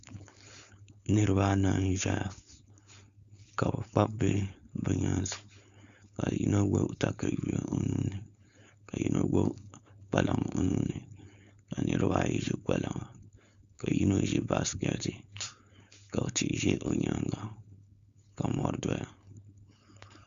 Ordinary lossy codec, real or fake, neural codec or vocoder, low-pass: Opus, 64 kbps; fake; codec, 16 kHz, 4.8 kbps, FACodec; 7.2 kHz